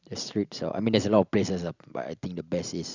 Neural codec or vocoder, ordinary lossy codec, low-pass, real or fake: none; none; 7.2 kHz; real